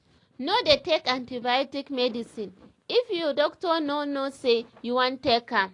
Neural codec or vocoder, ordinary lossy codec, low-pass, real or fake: none; AAC, 48 kbps; 10.8 kHz; real